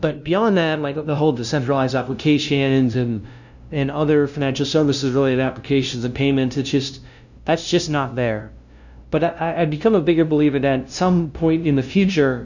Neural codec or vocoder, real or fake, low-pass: codec, 16 kHz, 0.5 kbps, FunCodec, trained on LibriTTS, 25 frames a second; fake; 7.2 kHz